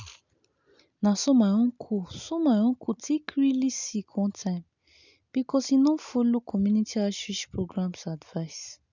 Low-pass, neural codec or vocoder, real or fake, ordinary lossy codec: 7.2 kHz; none; real; none